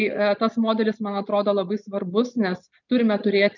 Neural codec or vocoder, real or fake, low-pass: none; real; 7.2 kHz